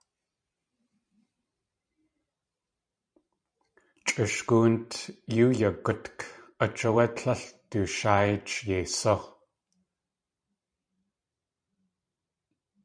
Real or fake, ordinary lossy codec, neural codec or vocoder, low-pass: fake; MP3, 96 kbps; vocoder, 44.1 kHz, 128 mel bands every 512 samples, BigVGAN v2; 9.9 kHz